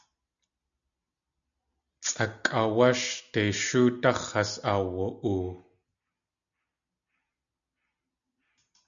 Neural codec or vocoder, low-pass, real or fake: none; 7.2 kHz; real